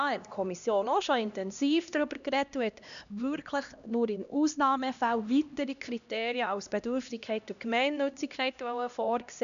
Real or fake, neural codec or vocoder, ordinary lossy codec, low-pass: fake; codec, 16 kHz, 1 kbps, X-Codec, HuBERT features, trained on LibriSpeech; none; 7.2 kHz